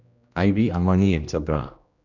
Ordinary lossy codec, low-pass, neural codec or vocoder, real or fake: none; 7.2 kHz; codec, 16 kHz, 1 kbps, X-Codec, HuBERT features, trained on general audio; fake